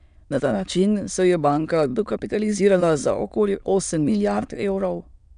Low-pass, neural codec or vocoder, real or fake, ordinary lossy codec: 9.9 kHz; autoencoder, 22.05 kHz, a latent of 192 numbers a frame, VITS, trained on many speakers; fake; none